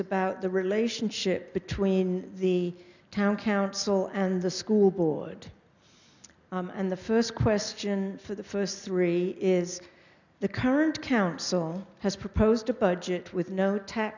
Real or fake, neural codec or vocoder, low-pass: real; none; 7.2 kHz